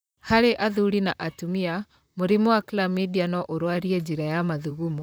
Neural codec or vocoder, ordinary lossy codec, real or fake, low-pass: vocoder, 44.1 kHz, 128 mel bands, Pupu-Vocoder; none; fake; none